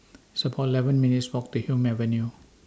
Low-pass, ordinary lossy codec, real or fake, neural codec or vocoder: none; none; real; none